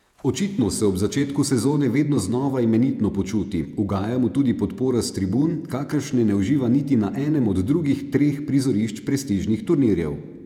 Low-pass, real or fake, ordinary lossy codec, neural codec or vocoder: 19.8 kHz; fake; none; vocoder, 48 kHz, 128 mel bands, Vocos